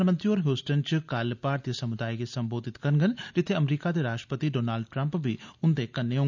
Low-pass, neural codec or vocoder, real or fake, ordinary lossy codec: 7.2 kHz; none; real; none